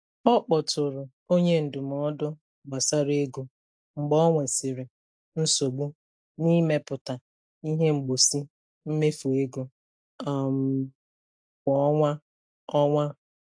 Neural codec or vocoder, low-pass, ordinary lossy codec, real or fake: none; 9.9 kHz; AAC, 64 kbps; real